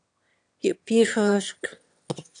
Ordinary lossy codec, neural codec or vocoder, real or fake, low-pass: AAC, 64 kbps; autoencoder, 22.05 kHz, a latent of 192 numbers a frame, VITS, trained on one speaker; fake; 9.9 kHz